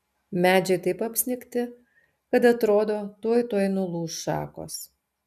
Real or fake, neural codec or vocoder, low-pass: real; none; 14.4 kHz